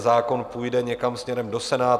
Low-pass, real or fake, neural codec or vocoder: 14.4 kHz; real; none